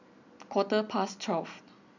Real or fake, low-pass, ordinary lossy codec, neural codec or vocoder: real; 7.2 kHz; none; none